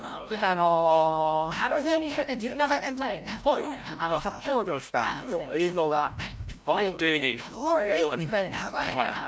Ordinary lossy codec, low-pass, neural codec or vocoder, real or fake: none; none; codec, 16 kHz, 0.5 kbps, FreqCodec, larger model; fake